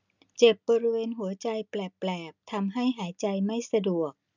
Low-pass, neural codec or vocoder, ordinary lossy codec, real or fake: 7.2 kHz; none; none; real